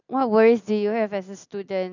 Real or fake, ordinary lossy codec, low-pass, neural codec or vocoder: real; none; 7.2 kHz; none